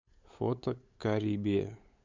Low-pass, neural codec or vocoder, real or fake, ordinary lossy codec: 7.2 kHz; codec, 16 kHz, 8 kbps, FunCodec, trained on LibriTTS, 25 frames a second; fake; MP3, 64 kbps